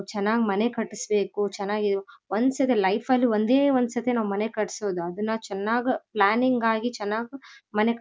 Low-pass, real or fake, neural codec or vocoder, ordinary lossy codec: none; real; none; none